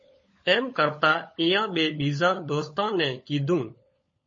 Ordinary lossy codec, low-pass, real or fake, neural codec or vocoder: MP3, 32 kbps; 7.2 kHz; fake; codec, 16 kHz, 16 kbps, FunCodec, trained on LibriTTS, 50 frames a second